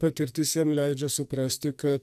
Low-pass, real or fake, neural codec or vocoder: 14.4 kHz; fake; codec, 44.1 kHz, 2.6 kbps, SNAC